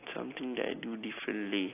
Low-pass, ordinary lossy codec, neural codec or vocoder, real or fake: 3.6 kHz; AAC, 24 kbps; none; real